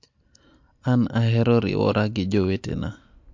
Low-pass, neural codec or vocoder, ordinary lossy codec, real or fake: 7.2 kHz; none; MP3, 48 kbps; real